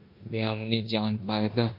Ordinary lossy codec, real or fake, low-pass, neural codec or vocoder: MP3, 48 kbps; fake; 5.4 kHz; codec, 16 kHz in and 24 kHz out, 0.9 kbps, LongCat-Audio-Codec, four codebook decoder